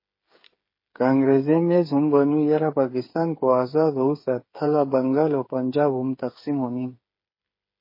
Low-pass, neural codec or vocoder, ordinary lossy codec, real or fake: 5.4 kHz; codec, 16 kHz, 8 kbps, FreqCodec, smaller model; MP3, 24 kbps; fake